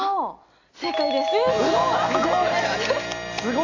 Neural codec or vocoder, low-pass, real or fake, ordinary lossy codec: none; 7.2 kHz; real; AAC, 32 kbps